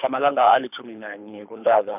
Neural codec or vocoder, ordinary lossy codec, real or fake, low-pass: codec, 24 kHz, 3 kbps, HILCodec; none; fake; 3.6 kHz